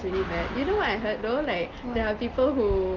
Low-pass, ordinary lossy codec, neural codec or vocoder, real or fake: 7.2 kHz; Opus, 32 kbps; none; real